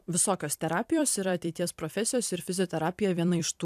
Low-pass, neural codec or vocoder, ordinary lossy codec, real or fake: 14.4 kHz; vocoder, 44.1 kHz, 128 mel bands every 256 samples, BigVGAN v2; AAC, 96 kbps; fake